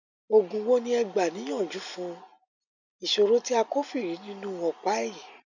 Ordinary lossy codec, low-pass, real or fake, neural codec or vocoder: none; 7.2 kHz; real; none